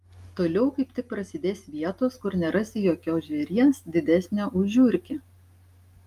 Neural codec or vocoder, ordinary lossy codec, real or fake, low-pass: none; Opus, 32 kbps; real; 14.4 kHz